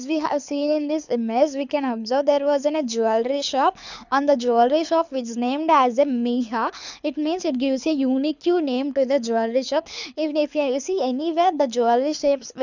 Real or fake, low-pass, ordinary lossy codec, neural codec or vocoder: fake; 7.2 kHz; none; codec, 24 kHz, 6 kbps, HILCodec